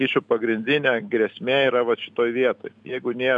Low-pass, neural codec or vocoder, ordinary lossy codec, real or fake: 10.8 kHz; none; MP3, 96 kbps; real